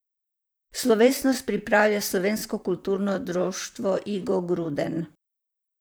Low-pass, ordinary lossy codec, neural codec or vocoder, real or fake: none; none; vocoder, 44.1 kHz, 128 mel bands, Pupu-Vocoder; fake